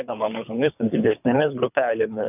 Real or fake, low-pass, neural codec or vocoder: fake; 3.6 kHz; codec, 24 kHz, 3 kbps, HILCodec